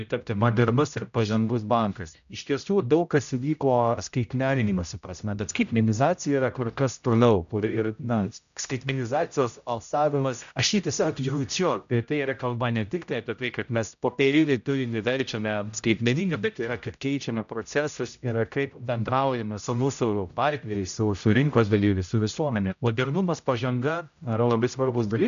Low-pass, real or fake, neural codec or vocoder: 7.2 kHz; fake; codec, 16 kHz, 0.5 kbps, X-Codec, HuBERT features, trained on general audio